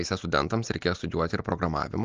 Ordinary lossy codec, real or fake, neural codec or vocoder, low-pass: Opus, 24 kbps; real; none; 7.2 kHz